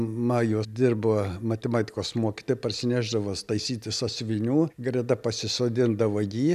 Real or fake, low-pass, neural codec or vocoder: real; 14.4 kHz; none